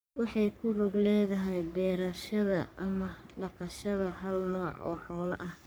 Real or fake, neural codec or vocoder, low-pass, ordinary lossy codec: fake; codec, 44.1 kHz, 3.4 kbps, Pupu-Codec; none; none